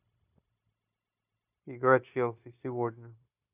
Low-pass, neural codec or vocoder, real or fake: 3.6 kHz; codec, 16 kHz, 0.9 kbps, LongCat-Audio-Codec; fake